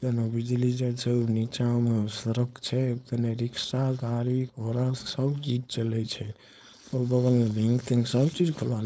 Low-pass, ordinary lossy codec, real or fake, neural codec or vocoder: none; none; fake; codec, 16 kHz, 4.8 kbps, FACodec